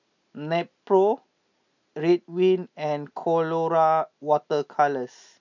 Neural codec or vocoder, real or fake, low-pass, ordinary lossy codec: none; real; 7.2 kHz; none